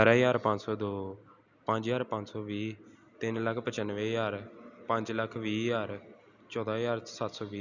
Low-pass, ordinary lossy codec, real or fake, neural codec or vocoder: 7.2 kHz; none; real; none